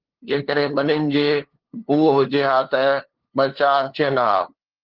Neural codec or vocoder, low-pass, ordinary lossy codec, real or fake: codec, 16 kHz, 2 kbps, FunCodec, trained on LibriTTS, 25 frames a second; 5.4 kHz; Opus, 16 kbps; fake